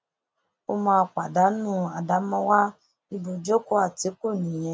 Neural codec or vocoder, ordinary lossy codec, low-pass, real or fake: none; none; none; real